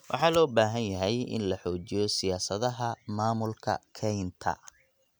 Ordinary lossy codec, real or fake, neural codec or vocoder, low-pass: none; real; none; none